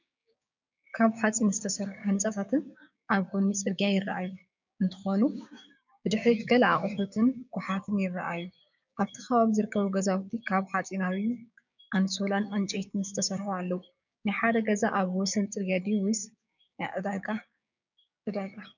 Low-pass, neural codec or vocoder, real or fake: 7.2 kHz; codec, 16 kHz, 6 kbps, DAC; fake